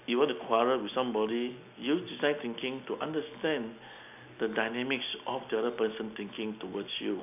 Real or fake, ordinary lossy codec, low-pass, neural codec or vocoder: real; none; 3.6 kHz; none